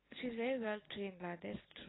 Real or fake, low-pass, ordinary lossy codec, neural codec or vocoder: real; 7.2 kHz; AAC, 16 kbps; none